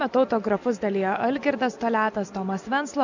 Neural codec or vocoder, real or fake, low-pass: none; real; 7.2 kHz